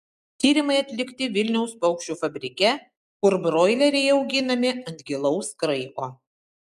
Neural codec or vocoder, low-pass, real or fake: none; 14.4 kHz; real